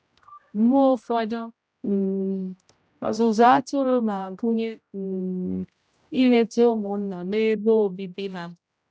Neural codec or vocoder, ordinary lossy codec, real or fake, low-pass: codec, 16 kHz, 0.5 kbps, X-Codec, HuBERT features, trained on general audio; none; fake; none